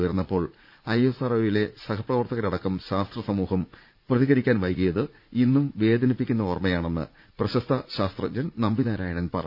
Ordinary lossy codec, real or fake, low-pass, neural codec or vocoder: none; fake; 5.4 kHz; vocoder, 44.1 kHz, 80 mel bands, Vocos